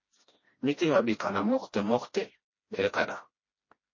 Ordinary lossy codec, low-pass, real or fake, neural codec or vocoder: MP3, 32 kbps; 7.2 kHz; fake; codec, 16 kHz, 1 kbps, FreqCodec, smaller model